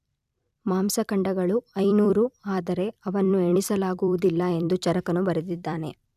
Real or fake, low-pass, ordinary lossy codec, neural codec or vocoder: fake; 14.4 kHz; none; vocoder, 44.1 kHz, 128 mel bands every 256 samples, BigVGAN v2